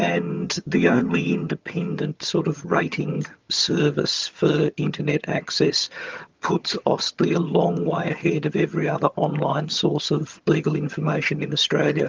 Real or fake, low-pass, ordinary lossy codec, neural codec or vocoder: fake; 7.2 kHz; Opus, 32 kbps; vocoder, 22.05 kHz, 80 mel bands, HiFi-GAN